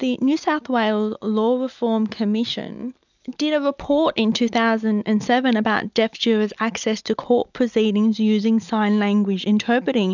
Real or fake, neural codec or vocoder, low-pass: real; none; 7.2 kHz